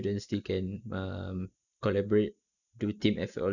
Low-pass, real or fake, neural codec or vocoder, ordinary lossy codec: 7.2 kHz; real; none; none